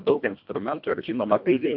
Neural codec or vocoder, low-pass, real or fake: codec, 24 kHz, 1.5 kbps, HILCodec; 5.4 kHz; fake